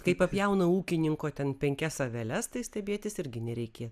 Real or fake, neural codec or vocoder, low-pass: real; none; 14.4 kHz